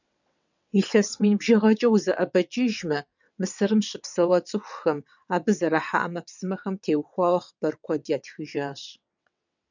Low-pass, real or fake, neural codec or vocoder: 7.2 kHz; fake; vocoder, 22.05 kHz, 80 mel bands, WaveNeXt